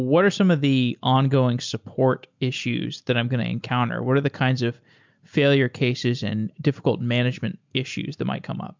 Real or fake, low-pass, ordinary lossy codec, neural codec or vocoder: real; 7.2 kHz; MP3, 64 kbps; none